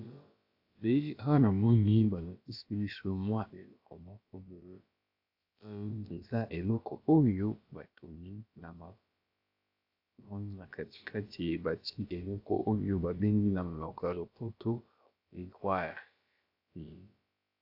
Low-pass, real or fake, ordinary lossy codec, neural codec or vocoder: 5.4 kHz; fake; AAC, 48 kbps; codec, 16 kHz, about 1 kbps, DyCAST, with the encoder's durations